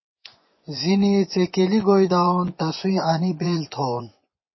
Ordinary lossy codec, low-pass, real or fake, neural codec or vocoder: MP3, 24 kbps; 7.2 kHz; fake; vocoder, 24 kHz, 100 mel bands, Vocos